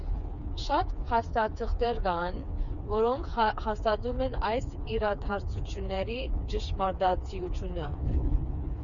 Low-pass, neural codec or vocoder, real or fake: 7.2 kHz; codec, 16 kHz, 4 kbps, FreqCodec, smaller model; fake